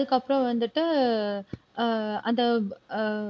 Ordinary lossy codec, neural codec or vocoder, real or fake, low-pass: none; none; real; none